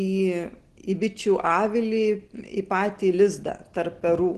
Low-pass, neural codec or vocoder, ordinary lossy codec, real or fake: 10.8 kHz; none; Opus, 16 kbps; real